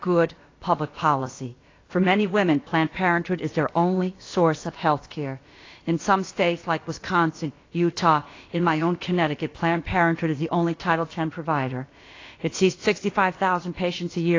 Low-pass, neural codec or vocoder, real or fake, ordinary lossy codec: 7.2 kHz; codec, 16 kHz, about 1 kbps, DyCAST, with the encoder's durations; fake; AAC, 32 kbps